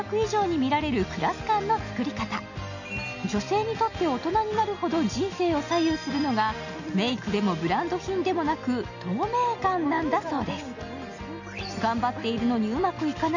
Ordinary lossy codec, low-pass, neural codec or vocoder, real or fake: none; 7.2 kHz; none; real